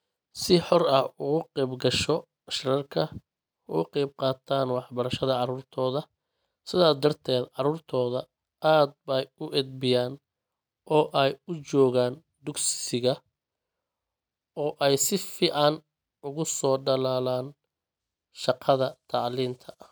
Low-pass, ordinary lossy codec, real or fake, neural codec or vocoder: none; none; real; none